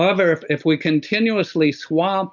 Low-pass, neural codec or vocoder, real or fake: 7.2 kHz; none; real